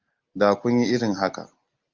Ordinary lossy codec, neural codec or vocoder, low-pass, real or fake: Opus, 24 kbps; none; 7.2 kHz; real